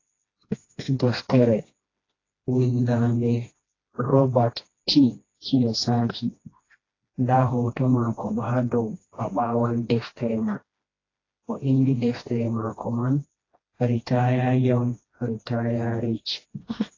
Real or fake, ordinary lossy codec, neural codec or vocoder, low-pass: fake; AAC, 32 kbps; codec, 16 kHz, 1 kbps, FreqCodec, smaller model; 7.2 kHz